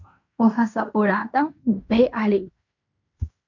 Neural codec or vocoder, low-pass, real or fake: codec, 16 kHz in and 24 kHz out, 0.9 kbps, LongCat-Audio-Codec, fine tuned four codebook decoder; 7.2 kHz; fake